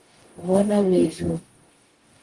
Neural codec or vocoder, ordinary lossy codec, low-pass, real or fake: codec, 44.1 kHz, 0.9 kbps, DAC; Opus, 24 kbps; 10.8 kHz; fake